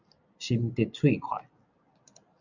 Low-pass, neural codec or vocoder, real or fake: 7.2 kHz; none; real